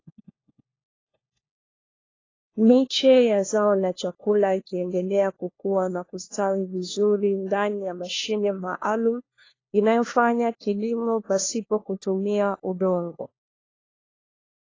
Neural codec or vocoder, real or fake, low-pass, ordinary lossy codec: codec, 16 kHz, 1 kbps, FunCodec, trained on LibriTTS, 50 frames a second; fake; 7.2 kHz; AAC, 32 kbps